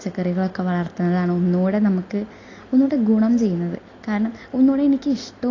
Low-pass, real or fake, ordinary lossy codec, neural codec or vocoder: 7.2 kHz; real; AAC, 32 kbps; none